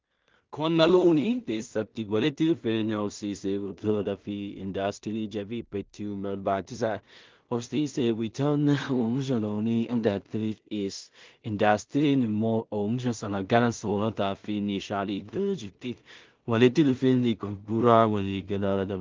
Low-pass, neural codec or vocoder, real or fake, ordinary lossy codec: 7.2 kHz; codec, 16 kHz in and 24 kHz out, 0.4 kbps, LongCat-Audio-Codec, two codebook decoder; fake; Opus, 32 kbps